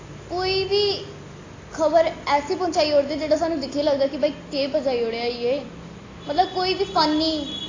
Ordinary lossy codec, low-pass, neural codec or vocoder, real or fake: AAC, 32 kbps; 7.2 kHz; none; real